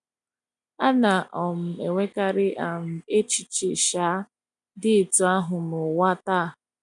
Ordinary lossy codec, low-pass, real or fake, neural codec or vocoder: none; 10.8 kHz; real; none